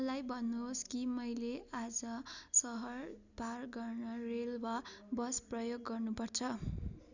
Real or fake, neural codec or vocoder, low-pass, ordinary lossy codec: real; none; 7.2 kHz; none